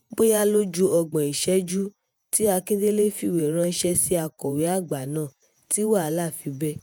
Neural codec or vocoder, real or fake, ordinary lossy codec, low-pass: vocoder, 48 kHz, 128 mel bands, Vocos; fake; none; none